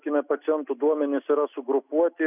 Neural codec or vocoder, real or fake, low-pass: none; real; 3.6 kHz